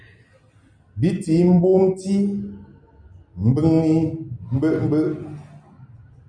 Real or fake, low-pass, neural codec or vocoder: real; 9.9 kHz; none